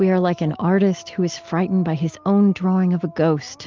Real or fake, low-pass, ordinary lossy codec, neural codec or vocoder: real; 7.2 kHz; Opus, 32 kbps; none